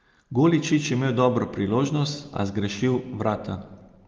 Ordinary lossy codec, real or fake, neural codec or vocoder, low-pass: Opus, 24 kbps; real; none; 7.2 kHz